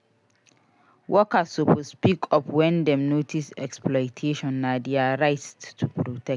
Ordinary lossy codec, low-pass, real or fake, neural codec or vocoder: MP3, 96 kbps; 10.8 kHz; real; none